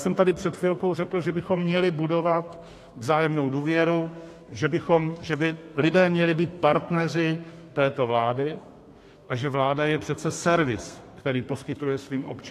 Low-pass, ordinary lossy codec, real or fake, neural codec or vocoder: 14.4 kHz; AAC, 64 kbps; fake; codec, 32 kHz, 1.9 kbps, SNAC